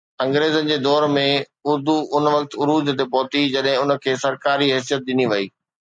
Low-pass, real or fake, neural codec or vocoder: 9.9 kHz; real; none